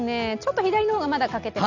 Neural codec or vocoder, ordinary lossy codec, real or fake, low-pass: none; none; real; 7.2 kHz